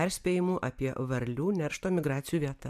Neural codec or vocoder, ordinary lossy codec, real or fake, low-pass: vocoder, 44.1 kHz, 128 mel bands every 512 samples, BigVGAN v2; MP3, 96 kbps; fake; 14.4 kHz